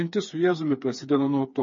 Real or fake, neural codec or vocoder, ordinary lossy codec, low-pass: fake; codec, 16 kHz, 4 kbps, FreqCodec, smaller model; MP3, 32 kbps; 7.2 kHz